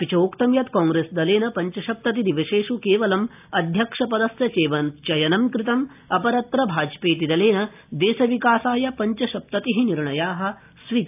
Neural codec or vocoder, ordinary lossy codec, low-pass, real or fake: none; AAC, 32 kbps; 3.6 kHz; real